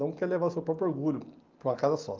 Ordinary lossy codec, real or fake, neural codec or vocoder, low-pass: Opus, 32 kbps; real; none; 7.2 kHz